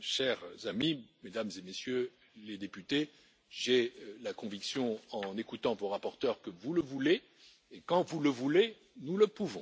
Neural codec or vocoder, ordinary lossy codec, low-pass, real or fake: none; none; none; real